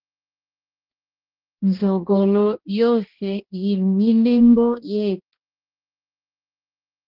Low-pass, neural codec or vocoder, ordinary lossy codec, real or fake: 5.4 kHz; codec, 16 kHz, 1 kbps, X-Codec, HuBERT features, trained on balanced general audio; Opus, 16 kbps; fake